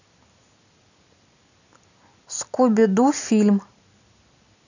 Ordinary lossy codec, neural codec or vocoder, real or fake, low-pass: none; none; real; 7.2 kHz